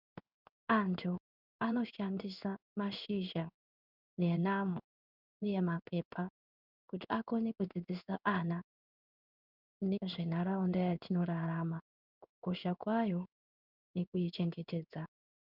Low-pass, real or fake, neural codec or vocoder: 5.4 kHz; fake; codec, 16 kHz in and 24 kHz out, 1 kbps, XY-Tokenizer